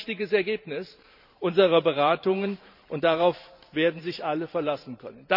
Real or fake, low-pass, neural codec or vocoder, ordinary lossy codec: fake; 5.4 kHz; vocoder, 44.1 kHz, 128 mel bands every 512 samples, BigVGAN v2; none